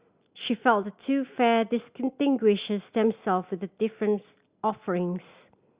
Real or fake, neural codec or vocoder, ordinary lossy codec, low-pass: real; none; Opus, 64 kbps; 3.6 kHz